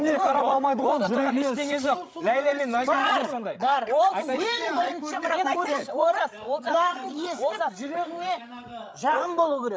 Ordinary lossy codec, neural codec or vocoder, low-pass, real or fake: none; codec, 16 kHz, 16 kbps, FreqCodec, larger model; none; fake